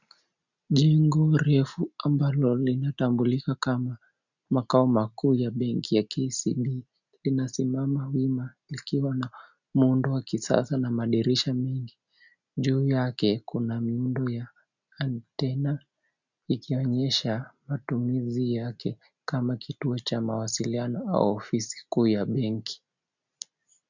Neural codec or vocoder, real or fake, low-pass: none; real; 7.2 kHz